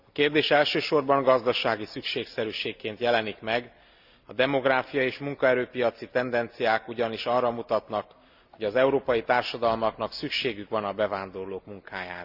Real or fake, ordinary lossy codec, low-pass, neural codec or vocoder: real; Opus, 64 kbps; 5.4 kHz; none